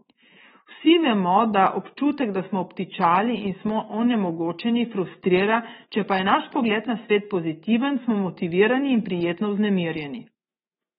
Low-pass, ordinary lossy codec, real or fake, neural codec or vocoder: 19.8 kHz; AAC, 16 kbps; fake; autoencoder, 48 kHz, 128 numbers a frame, DAC-VAE, trained on Japanese speech